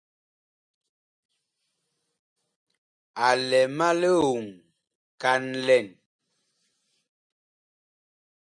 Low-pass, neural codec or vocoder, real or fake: 9.9 kHz; none; real